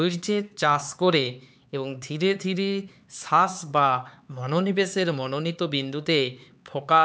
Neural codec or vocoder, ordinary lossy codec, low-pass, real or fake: codec, 16 kHz, 4 kbps, X-Codec, HuBERT features, trained on LibriSpeech; none; none; fake